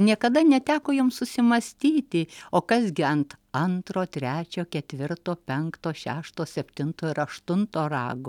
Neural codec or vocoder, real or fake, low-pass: none; real; 19.8 kHz